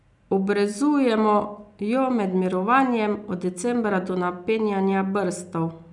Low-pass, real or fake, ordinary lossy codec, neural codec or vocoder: 10.8 kHz; real; none; none